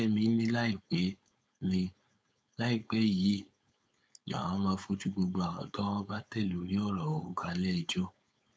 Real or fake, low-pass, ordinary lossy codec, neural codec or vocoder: fake; none; none; codec, 16 kHz, 4.8 kbps, FACodec